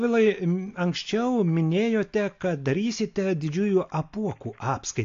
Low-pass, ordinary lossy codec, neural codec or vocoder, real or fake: 7.2 kHz; AAC, 48 kbps; none; real